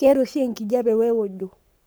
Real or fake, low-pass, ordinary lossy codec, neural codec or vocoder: fake; none; none; codec, 44.1 kHz, 7.8 kbps, Pupu-Codec